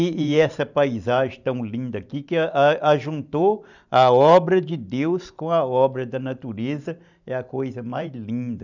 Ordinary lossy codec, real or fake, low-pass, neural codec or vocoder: none; fake; 7.2 kHz; vocoder, 44.1 kHz, 128 mel bands every 512 samples, BigVGAN v2